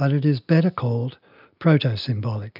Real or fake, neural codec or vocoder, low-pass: real; none; 5.4 kHz